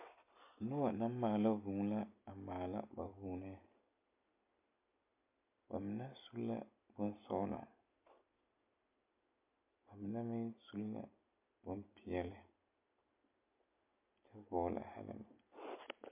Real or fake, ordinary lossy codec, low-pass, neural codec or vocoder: real; MP3, 32 kbps; 3.6 kHz; none